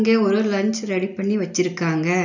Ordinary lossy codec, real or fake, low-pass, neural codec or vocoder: none; real; 7.2 kHz; none